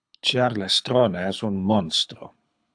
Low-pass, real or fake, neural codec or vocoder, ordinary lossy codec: 9.9 kHz; fake; codec, 24 kHz, 6 kbps, HILCodec; AAC, 64 kbps